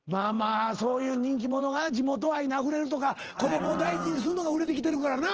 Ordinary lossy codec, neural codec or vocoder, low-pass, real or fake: Opus, 16 kbps; vocoder, 44.1 kHz, 80 mel bands, Vocos; 7.2 kHz; fake